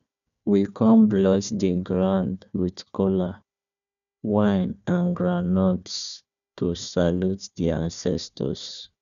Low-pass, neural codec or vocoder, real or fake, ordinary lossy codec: 7.2 kHz; codec, 16 kHz, 1 kbps, FunCodec, trained on Chinese and English, 50 frames a second; fake; none